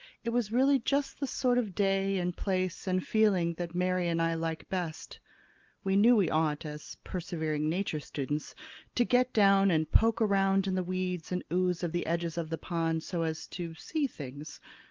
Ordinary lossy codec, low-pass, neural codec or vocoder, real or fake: Opus, 32 kbps; 7.2 kHz; none; real